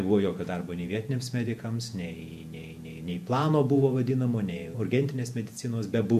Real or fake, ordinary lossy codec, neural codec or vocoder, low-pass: fake; MP3, 64 kbps; vocoder, 48 kHz, 128 mel bands, Vocos; 14.4 kHz